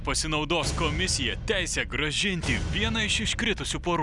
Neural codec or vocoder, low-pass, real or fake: none; 10.8 kHz; real